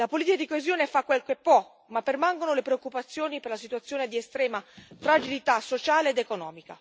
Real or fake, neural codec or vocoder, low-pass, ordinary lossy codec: real; none; none; none